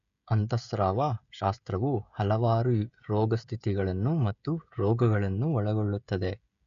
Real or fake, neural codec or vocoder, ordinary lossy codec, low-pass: fake; codec, 16 kHz, 16 kbps, FreqCodec, smaller model; none; 7.2 kHz